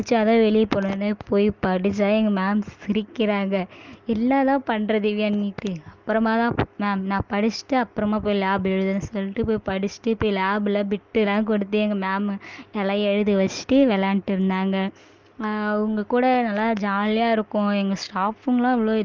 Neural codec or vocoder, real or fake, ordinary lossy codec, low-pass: none; real; Opus, 32 kbps; 7.2 kHz